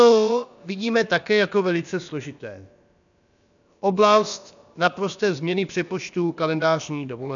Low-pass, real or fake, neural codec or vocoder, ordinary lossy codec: 7.2 kHz; fake; codec, 16 kHz, about 1 kbps, DyCAST, with the encoder's durations; MP3, 96 kbps